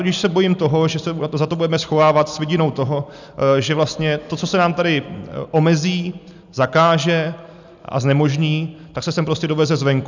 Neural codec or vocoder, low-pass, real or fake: none; 7.2 kHz; real